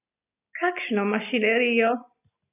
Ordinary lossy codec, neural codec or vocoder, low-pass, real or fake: none; none; 3.6 kHz; real